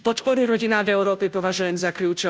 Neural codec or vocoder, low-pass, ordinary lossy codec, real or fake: codec, 16 kHz, 0.5 kbps, FunCodec, trained on Chinese and English, 25 frames a second; none; none; fake